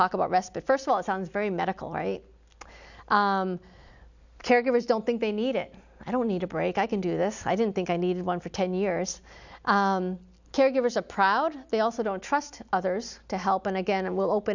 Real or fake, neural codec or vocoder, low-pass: real; none; 7.2 kHz